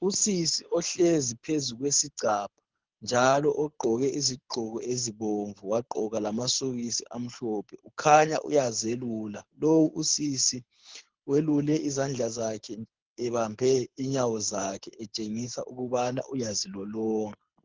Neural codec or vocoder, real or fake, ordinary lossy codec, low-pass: codec, 24 kHz, 6 kbps, HILCodec; fake; Opus, 16 kbps; 7.2 kHz